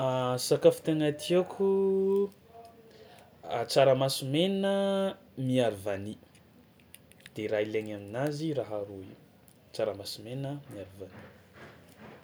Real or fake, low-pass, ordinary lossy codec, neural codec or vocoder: real; none; none; none